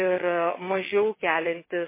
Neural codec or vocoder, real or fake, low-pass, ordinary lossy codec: vocoder, 22.05 kHz, 80 mel bands, WaveNeXt; fake; 3.6 kHz; MP3, 16 kbps